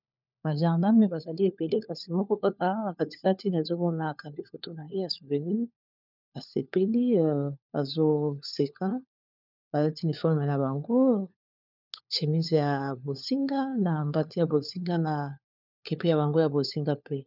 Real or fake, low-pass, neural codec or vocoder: fake; 5.4 kHz; codec, 16 kHz, 4 kbps, FunCodec, trained on LibriTTS, 50 frames a second